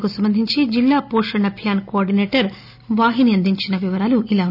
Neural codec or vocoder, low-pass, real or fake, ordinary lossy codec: none; 5.4 kHz; real; none